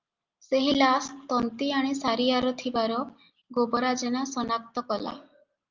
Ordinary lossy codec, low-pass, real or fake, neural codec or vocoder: Opus, 24 kbps; 7.2 kHz; real; none